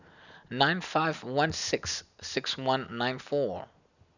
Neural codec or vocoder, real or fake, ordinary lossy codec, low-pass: none; real; none; 7.2 kHz